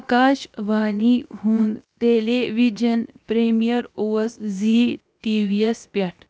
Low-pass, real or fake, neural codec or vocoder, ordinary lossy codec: none; fake; codec, 16 kHz, 0.7 kbps, FocalCodec; none